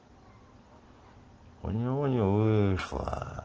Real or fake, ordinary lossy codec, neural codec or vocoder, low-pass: real; Opus, 16 kbps; none; 7.2 kHz